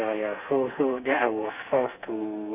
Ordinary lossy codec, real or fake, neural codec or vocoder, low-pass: none; fake; codec, 32 kHz, 1.9 kbps, SNAC; 3.6 kHz